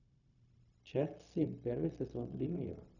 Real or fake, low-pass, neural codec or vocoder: fake; 7.2 kHz; codec, 16 kHz, 0.4 kbps, LongCat-Audio-Codec